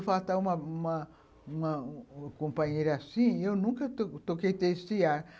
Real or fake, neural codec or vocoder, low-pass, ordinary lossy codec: real; none; none; none